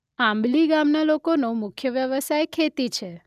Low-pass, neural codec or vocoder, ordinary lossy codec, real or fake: 14.4 kHz; none; none; real